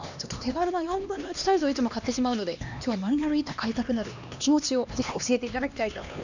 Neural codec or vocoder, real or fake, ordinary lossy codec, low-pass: codec, 16 kHz, 2 kbps, X-Codec, HuBERT features, trained on LibriSpeech; fake; none; 7.2 kHz